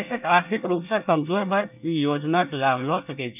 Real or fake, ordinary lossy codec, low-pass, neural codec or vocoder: fake; none; 3.6 kHz; codec, 24 kHz, 1 kbps, SNAC